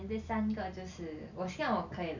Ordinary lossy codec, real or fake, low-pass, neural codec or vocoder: none; real; 7.2 kHz; none